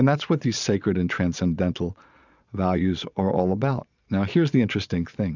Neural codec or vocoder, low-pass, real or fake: vocoder, 22.05 kHz, 80 mel bands, Vocos; 7.2 kHz; fake